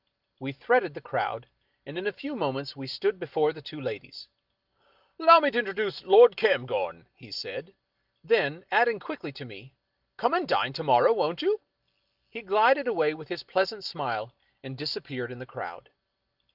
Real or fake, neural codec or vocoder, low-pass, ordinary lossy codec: real; none; 5.4 kHz; Opus, 32 kbps